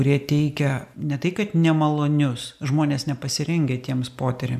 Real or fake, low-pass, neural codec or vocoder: real; 14.4 kHz; none